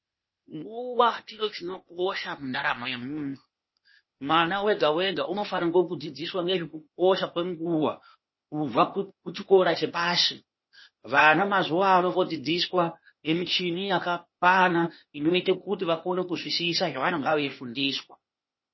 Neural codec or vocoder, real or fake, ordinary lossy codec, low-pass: codec, 16 kHz, 0.8 kbps, ZipCodec; fake; MP3, 24 kbps; 7.2 kHz